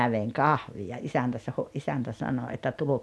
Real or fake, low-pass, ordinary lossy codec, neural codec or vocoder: real; none; none; none